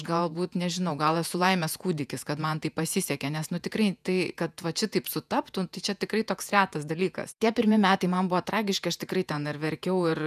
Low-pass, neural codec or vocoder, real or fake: 14.4 kHz; vocoder, 48 kHz, 128 mel bands, Vocos; fake